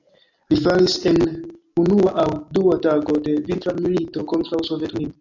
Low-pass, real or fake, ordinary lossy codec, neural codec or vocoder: 7.2 kHz; real; AAC, 48 kbps; none